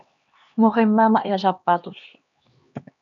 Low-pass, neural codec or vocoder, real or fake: 7.2 kHz; codec, 16 kHz, 2 kbps, X-Codec, HuBERT features, trained on LibriSpeech; fake